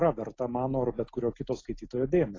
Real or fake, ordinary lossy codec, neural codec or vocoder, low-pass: real; AAC, 32 kbps; none; 7.2 kHz